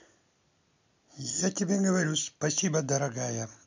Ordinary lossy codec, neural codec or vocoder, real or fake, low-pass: MP3, 64 kbps; none; real; 7.2 kHz